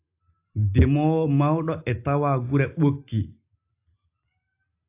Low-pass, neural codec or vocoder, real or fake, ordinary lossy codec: 3.6 kHz; none; real; AAC, 32 kbps